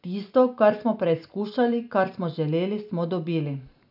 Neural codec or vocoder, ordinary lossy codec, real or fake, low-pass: none; none; real; 5.4 kHz